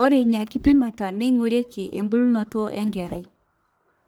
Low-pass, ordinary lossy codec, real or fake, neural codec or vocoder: none; none; fake; codec, 44.1 kHz, 1.7 kbps, Pupu-Codec